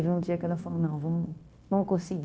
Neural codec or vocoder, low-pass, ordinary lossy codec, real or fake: codec, 16 kHz, 0.9 kbps, LongCat-Audio-Codec; none; none; fake